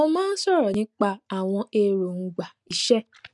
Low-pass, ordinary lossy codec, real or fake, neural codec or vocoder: 10.8 kHz; none; real; none